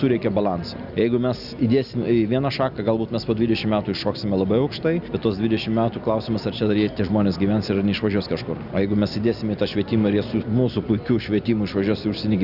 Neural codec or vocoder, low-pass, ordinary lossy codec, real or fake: none; 5.4 kHz; Opus, 64 kbps; real